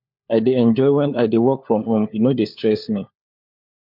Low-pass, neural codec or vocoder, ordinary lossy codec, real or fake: 5.4 kHz; codec, 16 kHz, 4 kbps, FunCodec, trained on LibriTTS, 50 frames a second; MP3, 48 kbps; fake